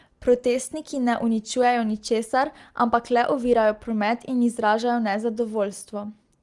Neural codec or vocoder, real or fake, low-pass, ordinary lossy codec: none; real; 10.8 kHz; Opus, 24 kbps